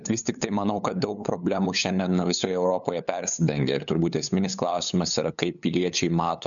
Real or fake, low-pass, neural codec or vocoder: fake; 7.2 kHz; codec, 16 kHz, 8 kbps, FunCodec, trained on LibriTTS, 25 frames a second